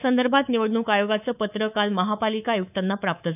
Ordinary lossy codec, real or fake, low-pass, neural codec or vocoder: none; fake; 3.6 kHz; codec, 24 kHz, 3.1 kbps, DualCodec